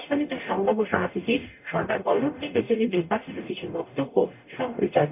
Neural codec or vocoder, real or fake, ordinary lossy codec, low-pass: codec, 44.1 kHz, 0.9 kbps, DAC; fake; none; 3.6 kHz